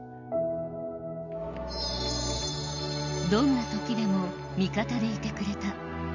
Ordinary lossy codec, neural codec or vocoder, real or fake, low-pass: none; none; real; 7.2 kHz